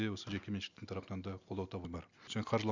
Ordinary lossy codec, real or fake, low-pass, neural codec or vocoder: Opus, 64 kbps; real; 7.2 kHz; none